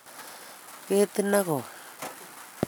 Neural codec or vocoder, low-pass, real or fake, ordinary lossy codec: none; none; real; none